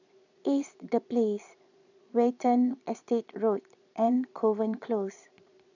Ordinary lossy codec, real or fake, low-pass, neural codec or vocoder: none; fake; 7.2 kHz; vocoder, 22.05 kHz, 80 mel bands, Vocos